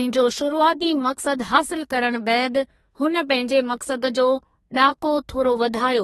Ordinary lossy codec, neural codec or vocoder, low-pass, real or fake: AAC, 32 kbps; codec, 32 kHz, 1.9 kbps, SNAC; 14.4 kHz; fake